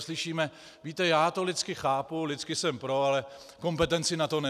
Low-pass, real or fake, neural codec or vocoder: 14.4 kHz; real; none